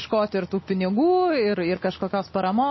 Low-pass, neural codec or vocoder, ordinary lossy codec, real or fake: 7.2 kHz; none; MP3, 24 kbps; real